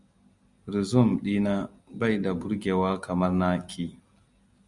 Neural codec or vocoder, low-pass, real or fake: none; 10.8 kHz; real